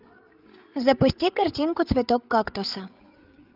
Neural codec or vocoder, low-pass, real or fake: codec, 16 kHz, 8 kbps, FreqCodec, larger model; 5.4 kHz; fake